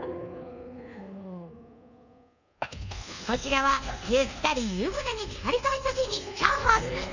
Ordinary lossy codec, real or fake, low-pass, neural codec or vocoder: none; fake; 7.2 kHz; codec, 24 kHz, 1.2 kbps, DualCodec